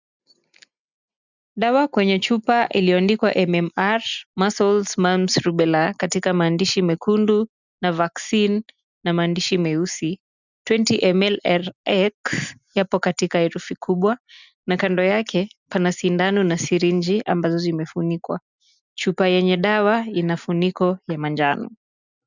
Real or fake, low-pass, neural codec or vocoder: real; 7.2 kHz; none